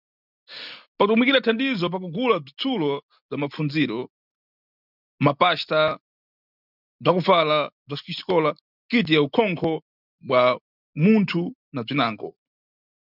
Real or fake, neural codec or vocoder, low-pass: real; none; 5.4 kHz